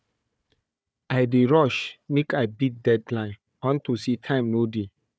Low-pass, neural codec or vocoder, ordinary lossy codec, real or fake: none; codec, 16 kHz, 4 kbps, FunCodec, trained on Chinese and English, 50 frames a second; none; fake